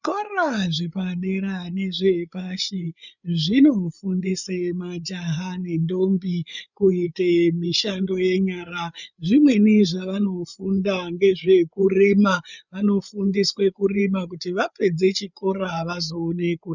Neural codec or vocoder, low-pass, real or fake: codec, 16 kHz, 8 kbps, FreqCodec, larger model; 7.2 kHz; fake